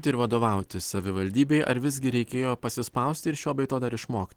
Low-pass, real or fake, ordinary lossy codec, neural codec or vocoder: 19.8 kHz; real; Opus, 16 kbps; none